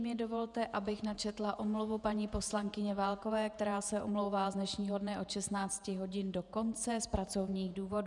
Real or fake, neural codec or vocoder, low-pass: fake; vocoder, 48 kHz, 128 mel bands, Vocos; 10.8 kHz